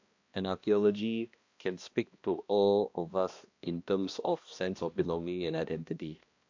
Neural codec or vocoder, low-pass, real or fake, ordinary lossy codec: codec, 16 kHz, 1 kbps, X-Codec, HuBERT features, trained on balanced general audio; 7.2 kHz; fake; AAC, 48 kbps